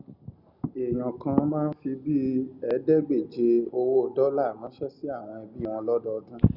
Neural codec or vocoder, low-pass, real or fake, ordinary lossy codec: none; 5.4 kHz; real; Opus, 64 kbps